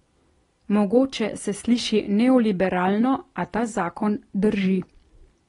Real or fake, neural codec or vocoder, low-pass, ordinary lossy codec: real; none; 10.8 kHz; AAC, 32 kbps